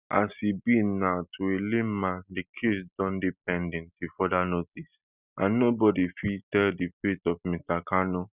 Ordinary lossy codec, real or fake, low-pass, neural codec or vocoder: Opus, 64 kbps; real; 3.6 kHz; none